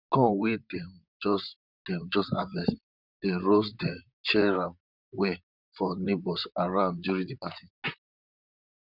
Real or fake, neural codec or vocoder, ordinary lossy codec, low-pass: fake; vocoder, 44.1 kHz, 128 mel bands, Pupu-Vocoder; none; 5.4 kHz